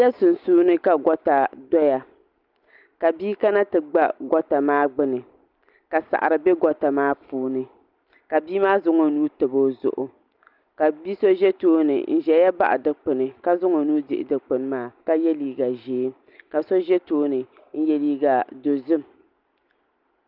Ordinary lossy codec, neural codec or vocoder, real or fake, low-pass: Opus, 24 kbps; none; real; 5.4 kHz